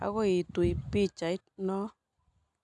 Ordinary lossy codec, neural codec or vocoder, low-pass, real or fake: none; none; 10.8 kHz; real